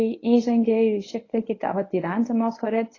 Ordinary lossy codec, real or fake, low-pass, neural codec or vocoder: AAC, 32 kbps; fake; 7.2 kHz; codec, 24 kHz, 0.9 kbps, WavTokenizer, medium speech release version 1